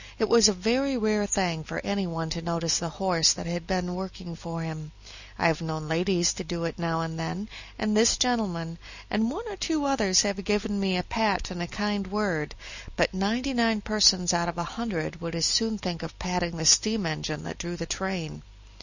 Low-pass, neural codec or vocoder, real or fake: 7.2 kHz; none; real